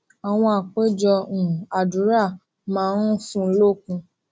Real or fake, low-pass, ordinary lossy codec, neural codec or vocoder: real; none; none; none